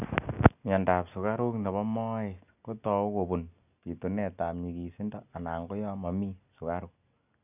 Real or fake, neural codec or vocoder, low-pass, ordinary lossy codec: real; none; 3.6 kHz; none